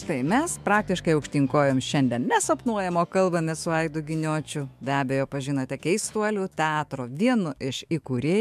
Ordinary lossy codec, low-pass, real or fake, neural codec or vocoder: MP3, 96 kbps; 14.4 kHz; fake; autoencoder, 48 kHz, 128 numbers a frame, DAC-VAE, trained on Japanese speech